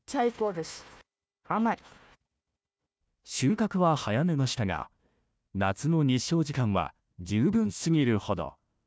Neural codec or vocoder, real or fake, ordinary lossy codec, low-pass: codec, 16 kHz, 1 kbps, FunCodec, trained on Chinese and English, 50 frames a second; fake; none; none